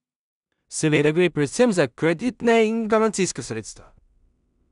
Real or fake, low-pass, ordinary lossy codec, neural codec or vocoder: fake; 10.8 kHz; none; codec, 16 kHz in and 24 kHz out, 0.4 kbps, LongCat-Audio-Codec, two codebook decoder